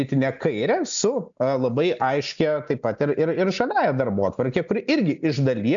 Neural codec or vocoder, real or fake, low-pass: none; real; 7.2 kHz